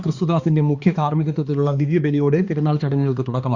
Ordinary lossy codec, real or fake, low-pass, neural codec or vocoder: Opus, 64 kbps; fake; 7.2 kHz; codec, 16 kHz, 2 kbps, X-Codec, HuBERT features, trained on balanced general audio